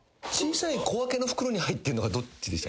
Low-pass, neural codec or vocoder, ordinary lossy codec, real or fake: none; none; none; real